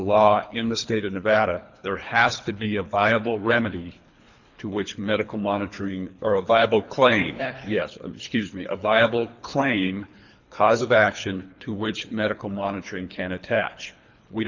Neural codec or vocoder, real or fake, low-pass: codec, 24 kHz, 3 kbps, HILCodec; fake; 7.2 kHz